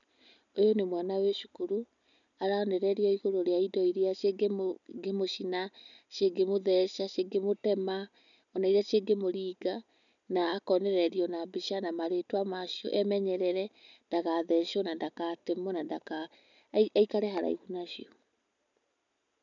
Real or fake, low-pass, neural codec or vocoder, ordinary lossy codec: real; 7.2 kHz; none; none